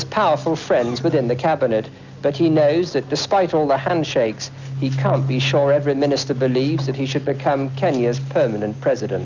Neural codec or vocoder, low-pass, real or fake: none; 7.2 kHz; real